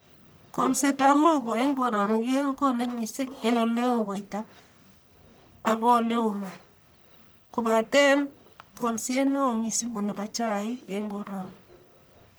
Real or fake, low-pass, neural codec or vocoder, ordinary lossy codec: fake; none; codec, 44.1 kHz, 1.7 kbps, Pupu-Codec; none